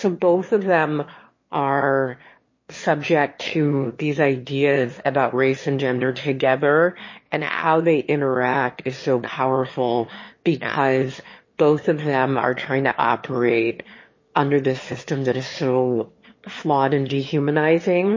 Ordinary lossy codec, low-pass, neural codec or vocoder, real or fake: MP3, 32 kbps; 7.2 kHz; autoencoder, 22.05 kHz, a latent of 192 numbers a frame, VITS, trained on one speaker; fake